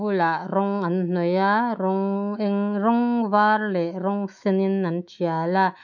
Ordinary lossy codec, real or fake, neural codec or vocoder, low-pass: none; fake; autoencoder, 48 kHz, 128 numbers a frame, DAC-VAE, trained on Japanese speech; 7.2 kHz